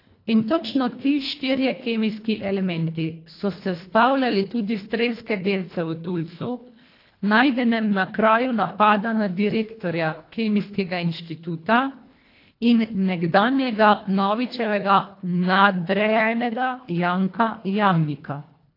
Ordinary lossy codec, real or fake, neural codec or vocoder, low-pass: AAC, 32 kbps; fake; codec, 24 kHz, 1.5 kbps, HILCodec; 5.4 kHz